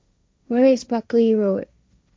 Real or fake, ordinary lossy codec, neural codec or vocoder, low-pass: fake; none; codec, 16 kHz, 1.1 kbps, Voila-Tokenizer; none